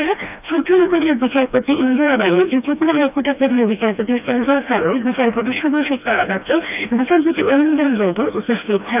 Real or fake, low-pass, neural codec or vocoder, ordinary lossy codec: fake; 3.6 kHz; codec, 16 kHz, 1 kbps, FreqCodec, smaller model; none